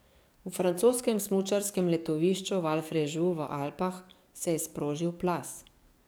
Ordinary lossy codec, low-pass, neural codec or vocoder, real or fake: none; none; codec, 44.1 kHz, 7.8 kbps, DAC; fake